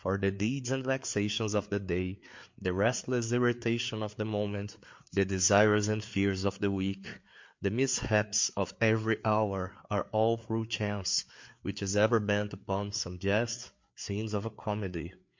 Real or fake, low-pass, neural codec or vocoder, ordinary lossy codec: fake; 7.2 kHz; codec, 16 kHz, 4 kbps, FreqCodec, larger model; MP3, 48 kbps